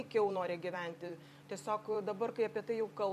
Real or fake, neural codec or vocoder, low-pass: fake; vocoder, 44.1 kHz, 128 mel bands every 256 samples, BigVGAN v2; 14.4 kHz